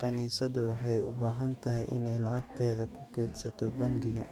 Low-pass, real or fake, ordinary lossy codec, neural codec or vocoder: 19.8 kHz; fake; MP3, 96 kbps; codec, 44.1 kHz, 2.6 kbps, DAC